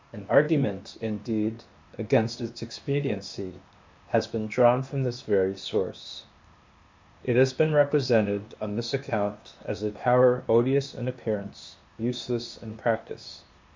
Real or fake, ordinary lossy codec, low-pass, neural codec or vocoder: fake; MP3, 48 kbps; 7.2 kHz; codec, 16 kHz, 0.8 kbps, ZipCodec